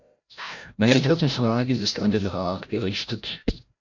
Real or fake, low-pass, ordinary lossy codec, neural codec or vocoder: fake; 7.2 kHz; MP3, 64 kbps; codec, 16 kHz, 0.5 kbps, FreqCodec, larger model